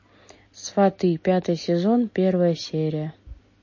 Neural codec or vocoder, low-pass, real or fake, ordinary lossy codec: none; 7.2 kHz; real; MP3, 32 kbps